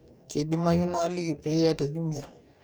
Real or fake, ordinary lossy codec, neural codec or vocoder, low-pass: fake; none; codec, 44.1 kHz, 2.6 kbps, DAC; none